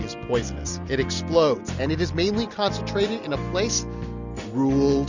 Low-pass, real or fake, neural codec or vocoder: 7.2 kHz; real; none